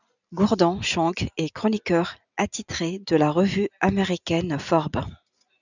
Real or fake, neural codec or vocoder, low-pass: real; none; 7.2 kHz